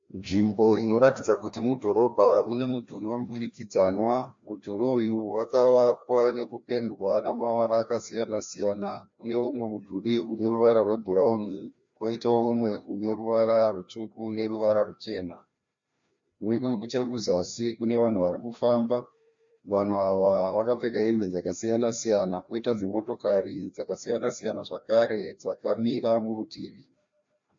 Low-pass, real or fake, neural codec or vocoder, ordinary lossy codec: 7.2 kHz; fake; codec, 16 kHz, 1 kbps, FreqCodec, larger model; MP3, 48 kbps